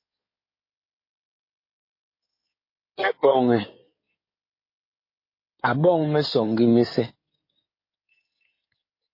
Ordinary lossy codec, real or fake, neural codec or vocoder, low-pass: MP3, 24 kbps; fake; codec, 16 kHz in and 24 kHz out, 2.2 kbps, FireRedTTS-2 codec; 5.4 kHz